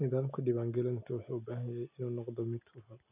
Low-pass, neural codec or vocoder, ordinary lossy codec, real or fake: 3.6 kHz; none; none; real